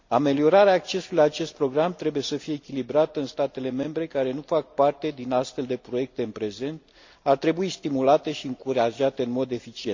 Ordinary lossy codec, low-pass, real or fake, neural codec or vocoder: MP3, 64 kbps; 7.2 kHz; real; none